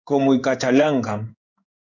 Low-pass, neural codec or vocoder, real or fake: 7.2 kHz; codec, 16 kHz in and 24 kHz out, 1 kbps, XY-Tokenizer; fake